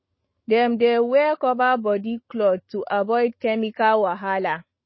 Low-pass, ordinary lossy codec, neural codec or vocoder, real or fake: 7.2 kHz; MP3, 24 kbps; autoencoder, 48 kHz, 128 numbers a frame, DAC-VAE, trained on Japanese speech; fake